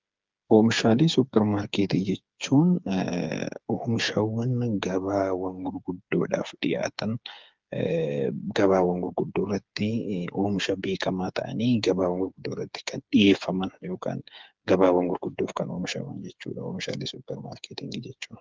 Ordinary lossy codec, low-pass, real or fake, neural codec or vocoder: Opus, 32 kbps; 7.2 kHz; fake; codec, 16 kHz, 8 kbps, FreqCodec, smaller model